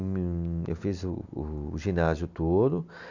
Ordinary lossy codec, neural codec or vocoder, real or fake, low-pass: none; none; real; 7.2 kHz